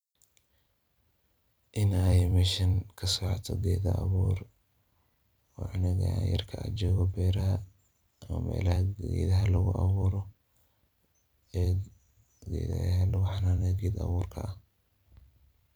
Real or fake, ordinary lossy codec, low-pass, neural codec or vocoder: fake; none; none; vocoder, 44.1 kHz, 128 mel bands every 256 samples, BigVGAN v2